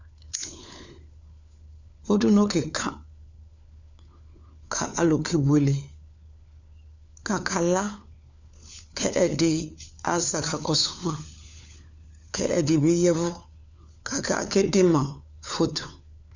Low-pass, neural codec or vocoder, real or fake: 7.2 kHz; codec, 16 kHz, 4 kbps, FunCodec, trained on LibriTTS, 50 frames a second; fake